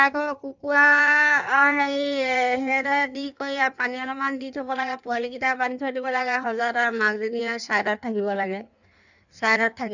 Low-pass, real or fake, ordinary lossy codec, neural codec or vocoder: 7.2 kHz; fake; none; codec, 16 kHz in and 24 kHz out, 1.1 kbps, FireRedTTS-2 codec